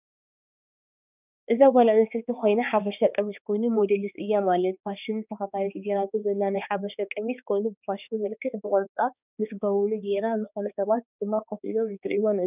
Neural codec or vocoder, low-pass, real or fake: codec, 16 kHz, 2 kbps, X-Codec, HuBERT features, trained on balanced general audio; 3.6 kHz; fake